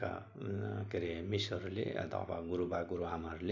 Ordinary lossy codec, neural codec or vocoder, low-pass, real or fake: none; none; 7.2 kHz; real